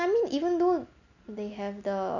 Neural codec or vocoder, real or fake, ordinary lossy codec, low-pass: none; real; none; 7.2 kHz